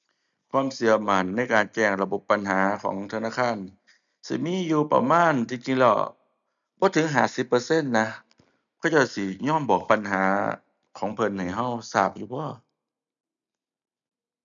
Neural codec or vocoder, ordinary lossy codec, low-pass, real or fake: none; none; 7.2 kHz; real